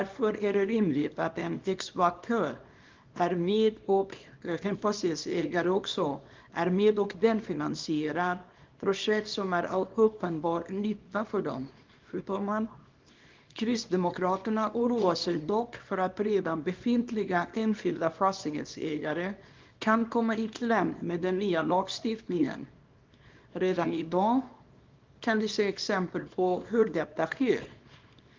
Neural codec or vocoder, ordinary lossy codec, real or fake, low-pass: codec, 24 kHz, 0.9 kbps, WavTokenizer, small release; Opus, 32 kbps; fake; 7.2 kHz